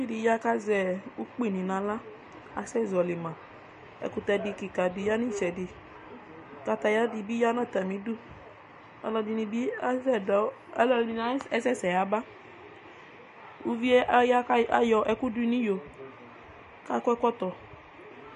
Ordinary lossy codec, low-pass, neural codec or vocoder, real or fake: MP3, 48 kbps; 14.4 kHz; none; real